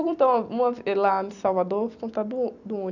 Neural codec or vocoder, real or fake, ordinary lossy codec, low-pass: vocoder, 44.1 kHz, 128 mel bands every 256 samples, BigVGAN v2; fake; none; 7.2 kHz